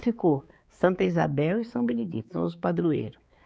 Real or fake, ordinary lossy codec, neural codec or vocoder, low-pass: fake; none; codec, 16 kHz, 4 kbps, X-Codec, HuBERT features, trained on balanced general audio; none